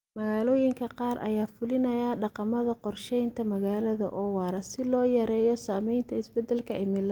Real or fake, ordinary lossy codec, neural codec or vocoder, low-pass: real; Opus, 32 kbps; none; 19.8 kHz